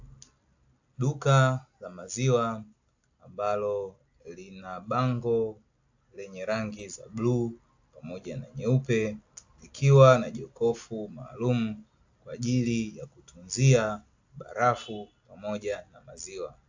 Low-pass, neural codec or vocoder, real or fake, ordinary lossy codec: 7.2 kHz; none; real; AAC, 48 kbps